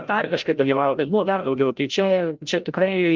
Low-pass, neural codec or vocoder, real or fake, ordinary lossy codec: 7.2 kHz; codec, 16 kHz, 0.5 kbps, FreqCodec, larger model; fake; Opus, 24 kbps